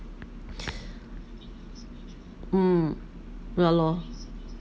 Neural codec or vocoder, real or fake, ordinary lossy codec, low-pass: none; real; none; none